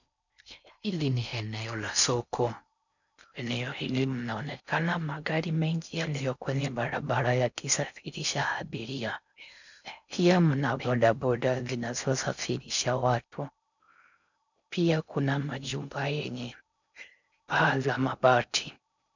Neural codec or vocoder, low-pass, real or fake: codec, 16 kHz in and 24 kHz out, 0.6 kbps, FocalCodec, streaming, 4096 codes; 7.2 kHz; fake